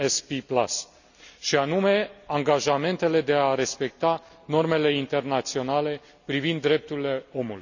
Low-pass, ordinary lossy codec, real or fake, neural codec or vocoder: 7.2 kHz; none; real; none